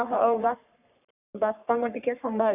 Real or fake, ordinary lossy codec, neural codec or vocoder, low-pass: fake; none; codec, 44.1 kHz, 3.4 kbps, Pupu-Codec; 3.6 kHz